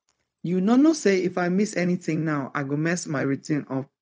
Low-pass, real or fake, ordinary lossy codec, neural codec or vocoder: none; fake; none; codec, 16 kHz, 0.4 kbps, LongCat-Audio-Codec